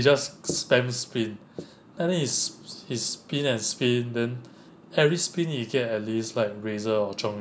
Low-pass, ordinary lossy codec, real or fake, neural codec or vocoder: none; none; real; none